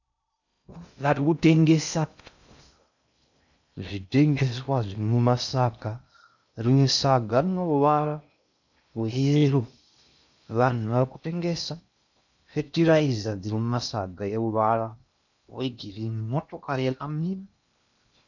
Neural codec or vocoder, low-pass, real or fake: codec, 16 kHz in and 24 kHz out, 0.6 kbps, FocalCodec, streaming, 2048 codes; 7.2 kHz; fake